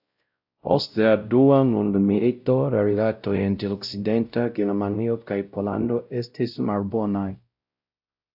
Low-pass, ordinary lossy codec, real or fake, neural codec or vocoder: 5.4 kHz; AAC, 48 kbps; fake; codec, 16 kHz, 0.5 kbps, X-Codec, WavLM features, trained on Multilingual LibriSpeech